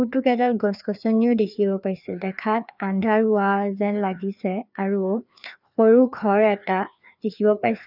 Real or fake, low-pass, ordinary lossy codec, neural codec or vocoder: fake; 5.4 kHz; none; codec, 16 kHz, 2 kbps, FreqCodec, larger model